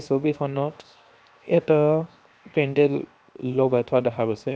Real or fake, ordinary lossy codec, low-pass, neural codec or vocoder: fake; none; none; codec, 16 kHz, 0.7 kbps, FocalCodec